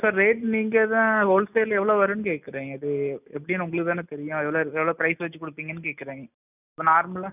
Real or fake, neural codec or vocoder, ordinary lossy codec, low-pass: real; none; none; 3.6 kHz